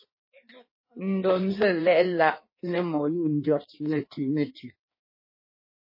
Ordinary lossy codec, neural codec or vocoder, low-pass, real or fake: MP3, 24 kbps; codec, 16 kHz in and 24 kHz out, 1.1 kbps, FireRedTTS-2 codec; 5.4 kHz; fake